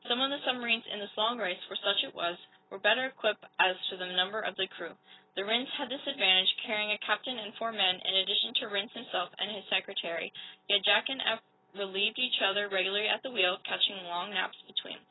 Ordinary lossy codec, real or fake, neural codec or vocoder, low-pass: AAC, 16 kbps; real; none; 7.2 kHz